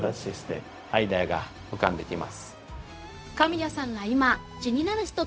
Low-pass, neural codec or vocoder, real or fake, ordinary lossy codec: none; codec, 16 kHz, 0.4 kbps, LongCat-Audio-Codec; fake; none